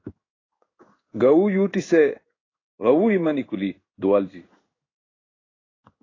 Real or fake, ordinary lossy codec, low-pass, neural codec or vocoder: fake; AAC, 32 kbps; 7.2 kHz; codec, 16 kHz in and 24 kHz out, 1 kbps, XY-Tokenizer